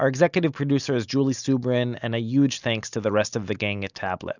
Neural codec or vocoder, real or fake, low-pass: none; real; 7.2 kHz